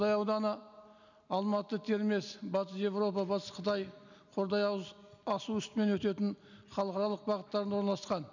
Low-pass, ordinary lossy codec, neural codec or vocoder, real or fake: 7.2 kHz; none; none; real